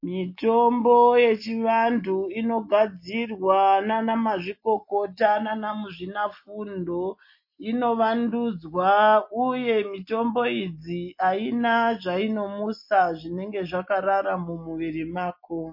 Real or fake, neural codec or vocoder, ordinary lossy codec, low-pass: real; none; MP3, 32 kbps; 5.4 kHz